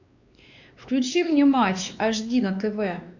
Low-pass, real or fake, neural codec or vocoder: 7.2 kHz; fake; codec, 16 kHz, 2 kbps, X-Codec, WavLM features, trained on Multilingual LibriSpeech